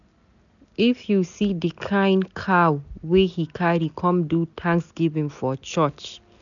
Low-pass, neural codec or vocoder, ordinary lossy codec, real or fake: 7.2 kHz; none; none; real